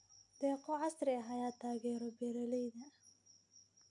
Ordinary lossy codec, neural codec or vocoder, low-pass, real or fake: none; none; 10.8 kHz; real